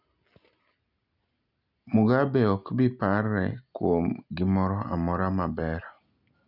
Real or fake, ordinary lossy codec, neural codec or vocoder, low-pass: real; none; none; 5.4 kHz